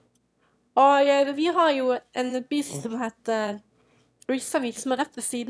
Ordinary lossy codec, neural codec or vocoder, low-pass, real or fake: none; autoencoder, 22.05 kHz, a latent of 192 numbers a frame, VITS, trained on one speaker; none; fake